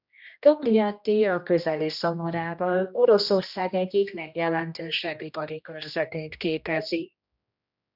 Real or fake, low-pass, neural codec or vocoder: fake; 5.4 kHz; codec, 16 kHz, 1 kbps, X-Codec, HuBERT features, trained on general audio